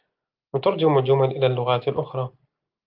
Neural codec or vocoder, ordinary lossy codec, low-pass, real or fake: none; Opus, 24 kbps; 5.4 kHz; real